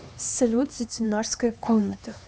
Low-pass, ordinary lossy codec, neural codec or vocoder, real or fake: none; none; codec, 16 kHz, 2 kbps, X-Codec, HuBERT features, trained on LibriSpeech; fake